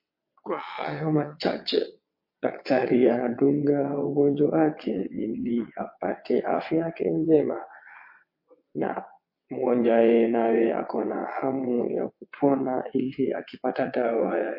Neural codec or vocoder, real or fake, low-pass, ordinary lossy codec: vocoder, 22.05 kHz, 80 mel bands, WaveNeXt; fake; 5.4 kHz; MP3, 32 kbps